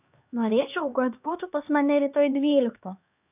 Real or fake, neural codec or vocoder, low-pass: fake; codec, 16 kHz, 2 kbps, X-Codec, HuBERT features, trained on LibriSpeech; 3.6 kHz